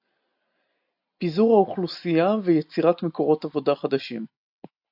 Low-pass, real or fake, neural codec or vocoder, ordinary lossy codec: 5.4 kHz; real; none; AAC, 48 kbps